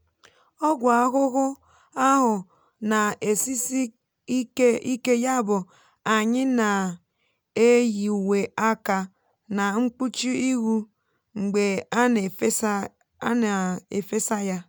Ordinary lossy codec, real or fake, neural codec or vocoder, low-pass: none; real; none; none